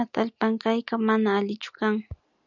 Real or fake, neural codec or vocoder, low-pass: real; none; 7.2 kHz